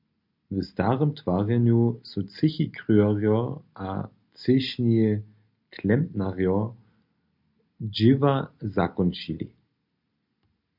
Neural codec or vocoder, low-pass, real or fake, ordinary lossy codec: none; 5.4 kHz; real; MP3, 48 kbps